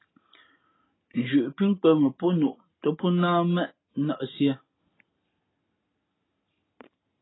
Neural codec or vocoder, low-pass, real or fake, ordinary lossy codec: none; 7.2 kHz; real; AAC, 16 kbps